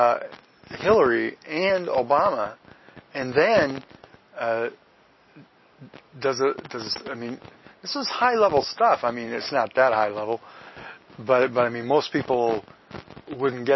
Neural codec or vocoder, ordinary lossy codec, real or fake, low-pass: none; MP3, 24 kbps; real; 7.2 kHz